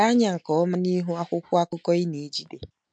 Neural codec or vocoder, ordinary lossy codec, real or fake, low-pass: none; MP3, 64 kbps; real; 9.9 kHz